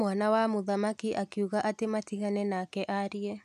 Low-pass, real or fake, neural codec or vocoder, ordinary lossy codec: 14.4 kHz; real; none; none